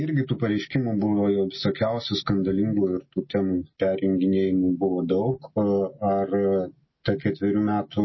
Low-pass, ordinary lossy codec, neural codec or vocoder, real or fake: 7.2 kHz; MP3, 24 kbps; none; real